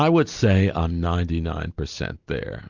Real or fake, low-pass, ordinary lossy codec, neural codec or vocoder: real; 7.2 kHz; Opus, 64 kbps; none